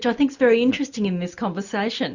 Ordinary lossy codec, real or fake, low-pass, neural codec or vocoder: Opus, 64 kbps; real; 7.2 kHz; none